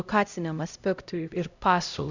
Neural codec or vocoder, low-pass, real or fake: codec, 16 kHz, 0.5 kbps, X-Codec, HuBERT features, trained on LibriSpeech; 7.2 kHz; fake